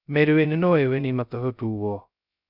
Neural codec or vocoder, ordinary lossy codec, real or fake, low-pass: codec, 16 kHz, 0.2 kbps, FocalCodec; none; fake; 5.4 kHz